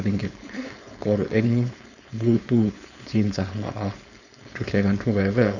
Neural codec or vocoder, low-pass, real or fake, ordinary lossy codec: codec, 16 kHz, 4.8 kbps, FACodec; 7.2 kHz; fake; none